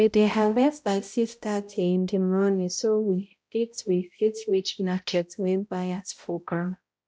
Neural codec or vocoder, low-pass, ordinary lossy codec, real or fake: codec, 16 kHz, 0.5 kbps, X-Codec, HuBERT features, trained on balanced general audio; none; none; fake